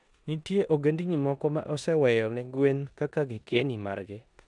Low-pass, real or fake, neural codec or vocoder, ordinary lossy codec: 10.8 kHz; fake; codec, 16 kHz in and 24 kHz out, 0.9 kbps, LongCat-Audio-Codec, four codebook decoder; none